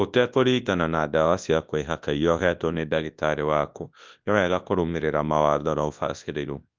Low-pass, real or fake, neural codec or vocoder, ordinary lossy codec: 7.2 kHz; fake; codec, 24 kHz, 0.9 kbps, WavTokenizer, large speech release; Opus, 32 kbps